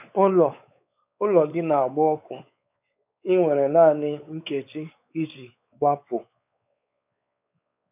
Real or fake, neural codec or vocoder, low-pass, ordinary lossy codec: fake; codec, 16 kHz, 4 kbps, X-Codec, WavLM features, trained on Multilingual LibriSpeech; 3.6 kHz; none